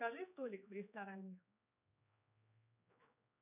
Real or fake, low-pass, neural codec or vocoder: fake; 3.6 kHz; codec, 16 kHz, 2 kbps, X-Codec, HuBERT features, trained on general audio